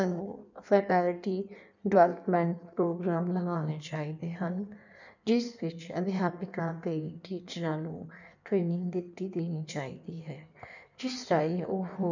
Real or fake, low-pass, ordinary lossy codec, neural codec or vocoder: fake; 7.2 kHz; none; codec, 16 kHz in and 24 kHz out, 1.1 kbps, FireRedTTS-2 codec